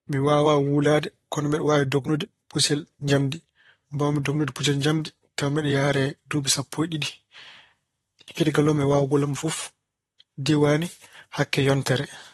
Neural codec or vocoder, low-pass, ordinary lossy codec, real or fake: vocoder, 44.1 kHz, 128 mel bands, Pupu-Vocoder; 19.8 kHz; AAC, 32 kbps; fake